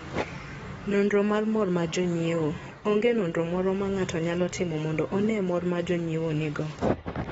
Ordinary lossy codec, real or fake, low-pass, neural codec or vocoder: AAC, 24 kbps; fake; 19.8 kHz; codec, 44.1 kHz, 7.8 kbps, DAC